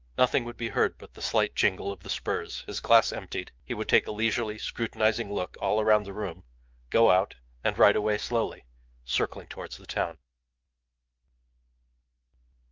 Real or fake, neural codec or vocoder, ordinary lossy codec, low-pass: fake; autoencoder, 48 kHz, 128 numbers a frame, DAC-VAE, trained on Japanese speech; Opus, 32 kbps; 7.2 kHz